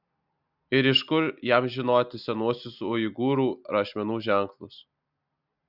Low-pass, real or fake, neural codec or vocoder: 5.4 kHz; real; none